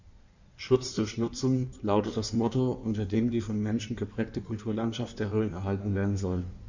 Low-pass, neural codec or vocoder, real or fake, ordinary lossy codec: 7.2 kHz; codec, 16 kHz in and 24 kHz out, 1.1 kbps, FireRedTTS-2 codec; fake; none